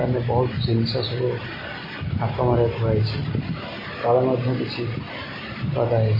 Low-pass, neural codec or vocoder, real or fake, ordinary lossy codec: 5.4 kHz; none; real; MP3, 24 kbps